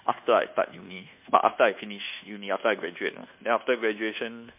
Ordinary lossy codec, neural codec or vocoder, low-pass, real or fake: MP3, 32 kbps; codec, 24 kHz, 1.2 kbps, DualCodec; 3.6 kHz; fake